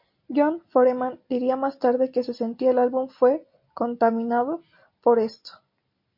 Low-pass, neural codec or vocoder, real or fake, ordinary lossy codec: 5.4 kHz; none; real; MP3, 48 kbps